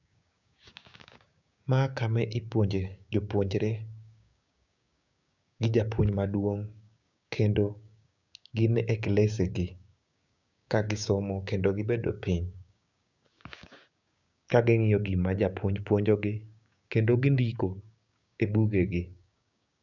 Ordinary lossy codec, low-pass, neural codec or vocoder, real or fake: none; 7.2 kHz; codec, 16 kHz, 6 kbps, DAC; fake